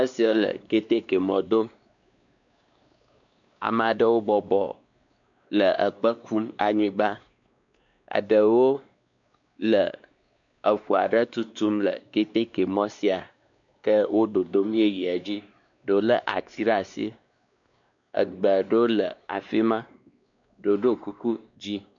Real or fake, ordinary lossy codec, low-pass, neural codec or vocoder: fake; MP3, 96 kbps; 7.2 kHz; codec, 16 kHz, 2 kbps, X-Codec, WavLM features, trained on Multilingual LibriSpeech